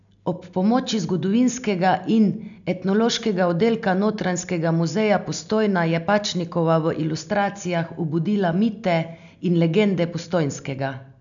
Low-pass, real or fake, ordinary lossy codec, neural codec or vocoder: 7.2 kHz; real; none; none